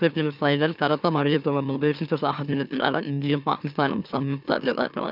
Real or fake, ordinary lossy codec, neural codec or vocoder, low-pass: fake; none; autoencoder, 44.1 kHz, a latent of 192 numbers a frame, MeloTTS; 5.4 kHz